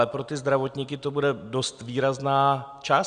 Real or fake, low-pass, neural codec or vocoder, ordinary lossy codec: real; 9.9 kHz; none; Opus, 64 kbps